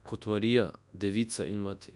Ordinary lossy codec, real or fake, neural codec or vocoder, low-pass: none; fake; codec, 24 kHz, 0.9 kbps, WavTokenizer, large speech release; 10.8 kHz